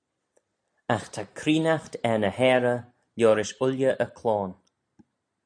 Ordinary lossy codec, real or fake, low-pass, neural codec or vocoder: AAC, 64 kbps; real; 9.9 kHz; none